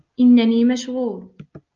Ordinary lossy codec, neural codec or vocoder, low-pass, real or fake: Opus, 24 kbps; none; 7.2 kHz; real